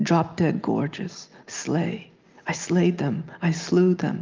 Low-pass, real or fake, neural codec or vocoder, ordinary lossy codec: 7.2 kHz; real; none; Opus, 32 kbps